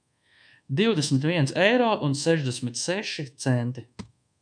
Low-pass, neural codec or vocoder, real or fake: 9.9 kHz; codec, 24 kHz, 1.2 kbps, DualCodec; fake